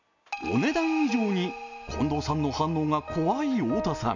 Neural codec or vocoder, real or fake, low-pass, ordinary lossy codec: none; real; 7.2 kHz; none